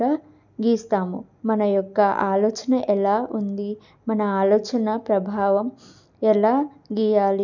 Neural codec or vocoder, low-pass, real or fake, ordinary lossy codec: none; 7.2 kHz; real; none